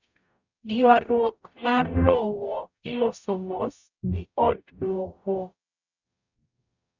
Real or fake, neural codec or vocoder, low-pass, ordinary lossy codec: fake; codec, 44.1 kHz, 0.9 kbps, DAC; 7.2 kHz; none